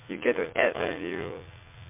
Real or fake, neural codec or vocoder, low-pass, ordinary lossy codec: fake; vocoder, 44.1 kHz, 80 mel bands, Vocos; 3.6 kHz; MP3, 32 kbps